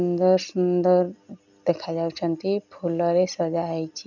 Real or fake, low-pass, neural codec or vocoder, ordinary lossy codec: real; 7.2 kHz; none; none